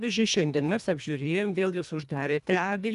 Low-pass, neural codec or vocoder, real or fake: 10.8 kHz; codec, 24 kHz, 1.5 kbps, HILCodec; fake